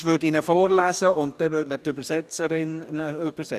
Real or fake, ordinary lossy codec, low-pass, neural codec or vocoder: fake; none; 14.4 kHz; codec, 44.1 kHz, 2.6 kbps, DAC